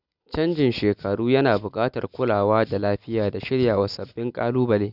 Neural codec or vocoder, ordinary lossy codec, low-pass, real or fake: none; none; 5.4 kHz; real